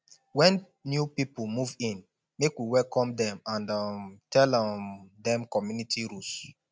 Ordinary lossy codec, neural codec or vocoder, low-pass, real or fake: none; none; none; real